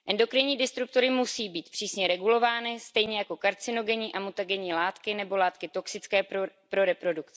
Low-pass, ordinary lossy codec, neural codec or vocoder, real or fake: none; none; none; real